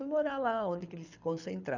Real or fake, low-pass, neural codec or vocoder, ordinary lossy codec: fake; 7.2 kHz; codec, 24 kHz, 6 kbps, HILCodec; none